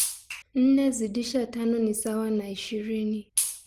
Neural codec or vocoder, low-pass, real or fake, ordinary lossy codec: none; 14.4 kHz; real; Opus, 24 kbps